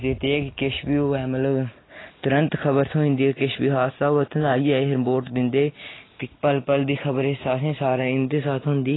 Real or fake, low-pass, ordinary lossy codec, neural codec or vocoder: real; 7.2 kHz; AAC, 16 kbps; none